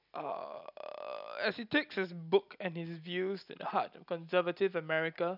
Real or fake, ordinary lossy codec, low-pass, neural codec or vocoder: real; none; 5.4 kHz; none